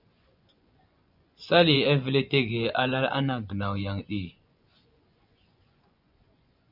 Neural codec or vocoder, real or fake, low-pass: vocoder, 24 kHz, 100 mel bands, Vocos; fake; 5.4 kHz